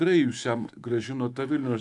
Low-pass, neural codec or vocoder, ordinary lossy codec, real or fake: 10.8 kHz; autoencoder, 48 kHz, 128 numbers a frame, DAC-VAE, trained on Japanese speech; AAC, 64 kbps; fake